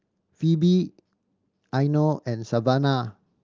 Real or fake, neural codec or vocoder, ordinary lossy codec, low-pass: real; none; Opus, 24 kbps; 7.2 kHz